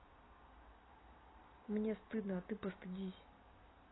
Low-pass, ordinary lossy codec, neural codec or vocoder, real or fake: 7.2 kHz; AAC, 16 kbps; none; real